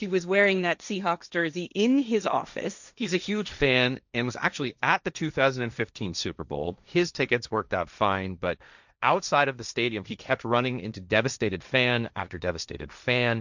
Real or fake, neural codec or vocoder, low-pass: fake; codec, 16 kHz, 1.1 kbps, Voila-Tokenizer; 7.2 kHz